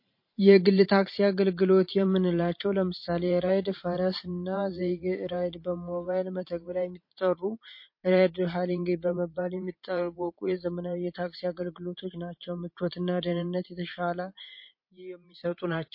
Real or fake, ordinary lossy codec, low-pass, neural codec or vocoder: fake; MP3, 32 kbps; 5.4 kHz; vocoder, 44.1 kHz, 128 mel bands every 512 samples, BigVGAN v2